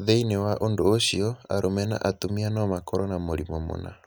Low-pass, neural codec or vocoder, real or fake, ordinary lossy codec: none; none; real; none